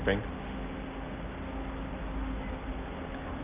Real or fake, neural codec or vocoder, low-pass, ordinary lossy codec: real; none; 3.6 kHz; Opus, 24 kbps